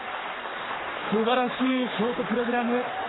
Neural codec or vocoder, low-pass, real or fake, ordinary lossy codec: codec, 44.1 kHz, 3.4 kbps, Pupu-Codec; 7.2 kHz; fake; AAC, 16 kbps